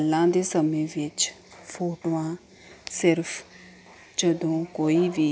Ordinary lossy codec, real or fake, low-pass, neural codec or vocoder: none; real; none; none